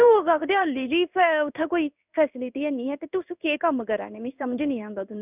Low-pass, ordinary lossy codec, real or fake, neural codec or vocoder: 3.6 kHz; none; fake; codec, 16 kHz in and 24 kHz out, 1 kbps, XY-Tokenizer